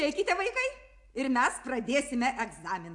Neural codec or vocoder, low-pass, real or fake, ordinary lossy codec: none; 10.8 kHz; real; AAC, 64 kbps